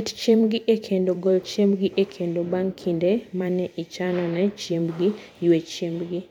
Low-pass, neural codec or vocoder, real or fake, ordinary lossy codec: 19.8 kHz; vocoder, 44.1 kHz, 128 mel bands every 512 samples, BigVGAN v2; fake; none